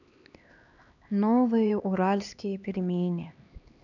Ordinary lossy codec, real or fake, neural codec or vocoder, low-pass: none; fake; codec, 16 kHz, 2 kbps, X-Codec, HuBERT features, trained on LibriSpeech; 7.2 kHz